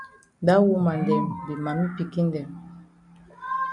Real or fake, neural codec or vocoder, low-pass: real; none; 10.8 kHz